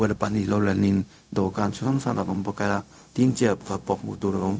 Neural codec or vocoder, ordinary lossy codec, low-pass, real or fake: codec, 16 kHz, 0.4 kbps, LongCat-Audio-Codec; none; none; fake